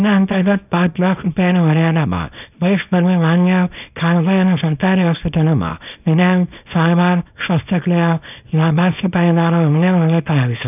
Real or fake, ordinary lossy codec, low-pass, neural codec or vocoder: fake; none; 3.6 kHz; codec, 24 kHz, 0.9 kbps, WavTokenizer, small release